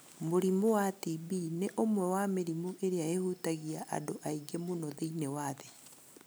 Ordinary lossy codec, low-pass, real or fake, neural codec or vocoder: none; none; real; none